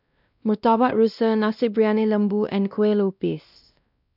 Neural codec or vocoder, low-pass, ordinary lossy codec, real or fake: codec, 16 kHz, 1 kbps, X-Codec, WavLM features, trained on Multilingual LibriSpeech; 5.4 kHz; none; fake